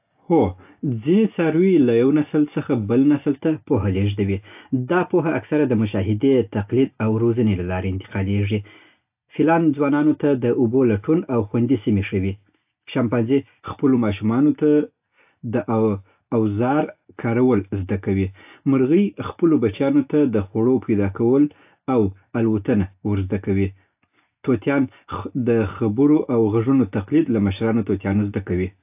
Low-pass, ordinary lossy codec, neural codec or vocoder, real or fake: 3.6 kHz; AAC, 32 kbps; none; real